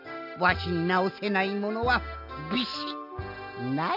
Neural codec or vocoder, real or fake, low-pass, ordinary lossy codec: none; real; 5.4 kHz; none